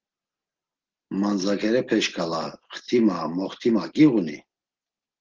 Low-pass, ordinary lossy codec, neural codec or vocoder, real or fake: 7.2 kHz; Opus, 16 kbps; none; real